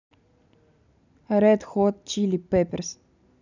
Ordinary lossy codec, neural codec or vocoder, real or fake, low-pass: none; none; real; 7.2 kHz